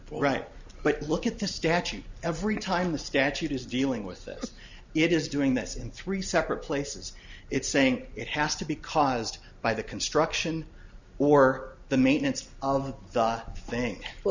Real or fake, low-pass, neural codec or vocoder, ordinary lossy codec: real; 7.2 kHz; none; Opus, 64 kbps